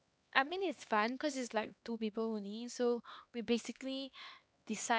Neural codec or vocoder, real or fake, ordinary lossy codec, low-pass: codec, 16 kHz, 2 kbps, X-Codec, HuBERT features, trained on LibriSpeech; fake; none; none